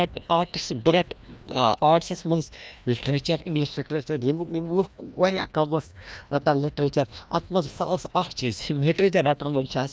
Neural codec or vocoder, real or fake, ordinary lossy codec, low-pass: codec, 16 kHz, 1 kbps, FreqCodec, larger model; fake; none; none